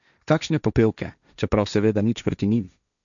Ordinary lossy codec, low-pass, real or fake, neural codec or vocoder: none; 7.2 kHz; fake; codec, 16 kHz, 1.1 kbps, Voila-Tokenizer